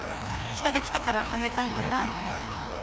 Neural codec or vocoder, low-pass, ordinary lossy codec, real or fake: codec, 16 kHz, 1 kbps, FreqCodec, larger model; none; none; fake